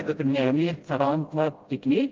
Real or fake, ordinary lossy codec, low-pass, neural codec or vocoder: fake; Opus, 32 kbps; 7.2 kHz; codec, 16 kHz, 0.5 kbps, FreqCodec, smaller model